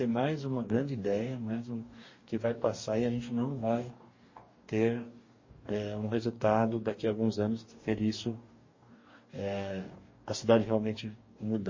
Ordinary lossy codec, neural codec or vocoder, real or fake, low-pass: MP3, 32 kbps; codec, 44.1 kHz, 2.6 kbps, DAC; fake; 7.2 kHz